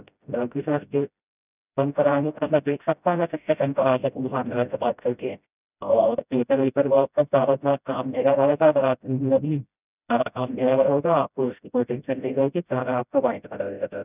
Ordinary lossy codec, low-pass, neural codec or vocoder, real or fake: none; 3.6 kHz; codec, 16 kHz, 0.5 kbps, FreqCodec, smaller model; fake